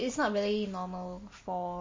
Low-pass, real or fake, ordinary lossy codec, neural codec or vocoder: 7.2 kHz; real; MP3, 32 kbps; none